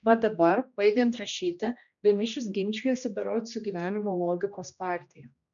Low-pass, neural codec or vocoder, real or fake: 7.2 kHz; codec, 16 kHz, 1 kbps, X-Codec, HuBERT features, trained on general audio; fake